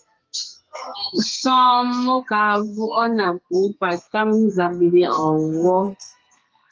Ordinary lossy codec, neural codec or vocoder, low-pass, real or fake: Opus, 24 kbps; codec, 32 kHz, 1.9 kbps, SNAC; 7.2 kHz; fake